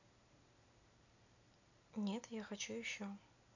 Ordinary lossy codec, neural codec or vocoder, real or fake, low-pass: none; none; real; 7.2 kHz